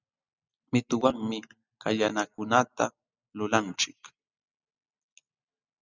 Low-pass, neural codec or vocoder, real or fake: 7.2 kHz; none; real